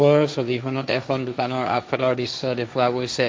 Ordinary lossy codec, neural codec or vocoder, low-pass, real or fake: none; codec, 16 kHz, 1.1 kbps, Voila-Tokenizer; none; fake